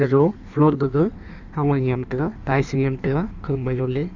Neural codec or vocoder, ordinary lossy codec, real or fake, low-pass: codec, 16 kHz in and 24 kHz out, 1.1 kbps, FireRedTTS-2 codec; none; fake; 7.2 kHz